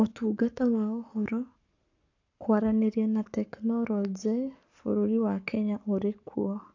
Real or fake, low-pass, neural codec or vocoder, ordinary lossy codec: fake; 7.2 kHz; codec, 44.1 kHz, 7.8 kbps, Pupu-Codec; AAC, 48 kbps